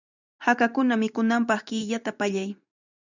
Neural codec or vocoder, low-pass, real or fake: vocoder, 44.1 kHz, 128 mel bands every 512 samples, BigVGAN v2; 7.2 kHz; fake